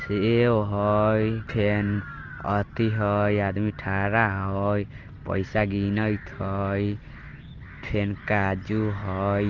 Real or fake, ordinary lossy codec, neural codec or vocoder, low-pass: real; Opus, 16 kbps; none; 7.2 kHz